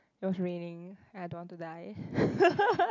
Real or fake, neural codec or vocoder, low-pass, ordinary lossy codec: real; none; 7.2 kHz; none